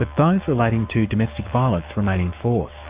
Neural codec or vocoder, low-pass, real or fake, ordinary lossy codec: codec, 16 kHz, 6 kbps, DAC; 3.6 kHz; fake; Opus, 64 kbps